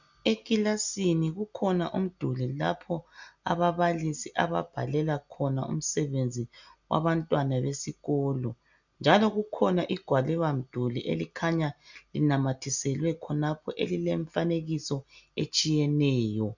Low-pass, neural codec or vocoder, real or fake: 7.2 kHz; none; real